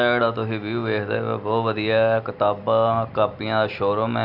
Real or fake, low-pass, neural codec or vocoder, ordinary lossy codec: real; 5.4 kHz; none; Opus, 64 kbps